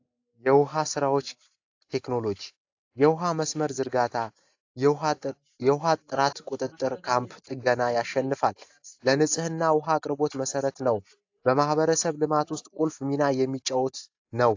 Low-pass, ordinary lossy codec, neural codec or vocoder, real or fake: 7.2 kHz; AAC, 48 kbps; none; real